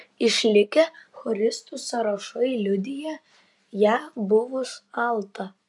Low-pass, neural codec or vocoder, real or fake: 10.8 kHz; none; real